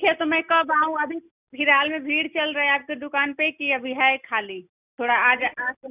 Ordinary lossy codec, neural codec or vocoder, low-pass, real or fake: none; none; 3.6 kHz; real